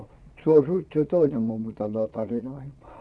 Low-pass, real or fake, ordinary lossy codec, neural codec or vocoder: none; fake; none; vocoder, 22.05 kHz, 80 mel bands, Vocos